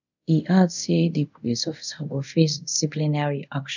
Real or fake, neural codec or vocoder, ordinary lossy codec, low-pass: fake; codec, 24 kHz, 0.5 kbps, DualCodec; none; 7.2 kHz